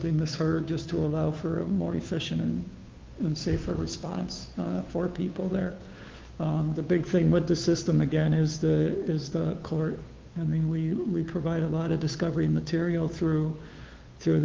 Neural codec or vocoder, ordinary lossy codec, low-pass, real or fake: codec, 16 kHz, 2 kbps, FunCodec, trained on Chinese and English, 25 frames a second; Opus, 32 kbps; 7.2 kHz; fake